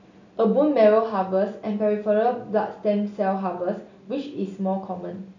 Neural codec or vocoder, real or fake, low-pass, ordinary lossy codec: none; real; 7.2 kHz; none